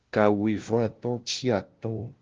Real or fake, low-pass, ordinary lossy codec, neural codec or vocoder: fake; 7.2 kHz; Opus, 16 kbps; codec, 16 kHz, 0.5 kbps, FunCodec, trained on LibriTTS, 25 frames a second